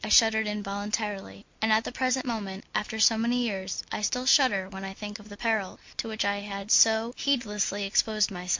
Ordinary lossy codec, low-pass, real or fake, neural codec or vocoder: MP3, 48 kbps; 7.2 kHz; real; none